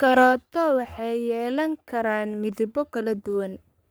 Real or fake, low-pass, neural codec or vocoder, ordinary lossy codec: fake; none; codec, 44.1 kHz, 3.4 kbps, Pupu-Codec; none